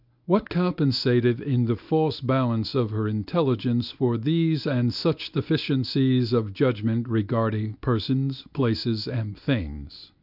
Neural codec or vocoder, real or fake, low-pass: codec, 24 kHz, 0.9 kbps, WavTokenizer, medium speech release version 1; fake; 5.4 kHz